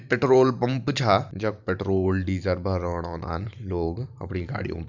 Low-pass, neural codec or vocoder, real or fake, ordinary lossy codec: 7.2 kHz; vocoder, 44.1 kHz, 128 mel bands every 512 samples, BigVGAN v2; fake; none